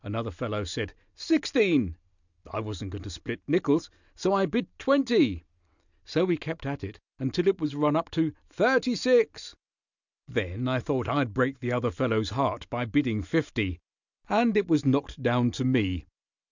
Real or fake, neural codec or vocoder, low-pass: real; none; 7.2 kHz